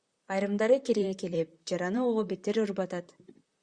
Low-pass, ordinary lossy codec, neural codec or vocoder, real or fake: 9.9 kHz; Opus, 64 kbps; vocoder, 44.1 kHz, 128 mel bands, Pupu-Vocoder; fake